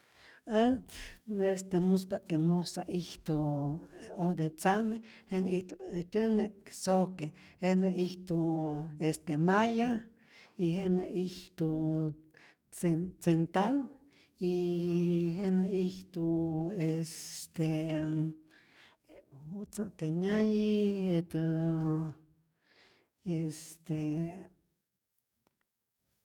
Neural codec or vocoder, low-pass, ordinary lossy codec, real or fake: codec, 44.1 kHz, 2.6 kbps, DAC; 19.8 kHz; none; fake